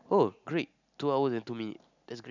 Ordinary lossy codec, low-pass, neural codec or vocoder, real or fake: none; 7.2 kHz; none; real